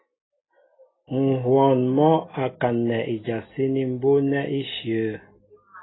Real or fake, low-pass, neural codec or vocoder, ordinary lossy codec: real; 7.2 kHz; none; AAC, 16 kbps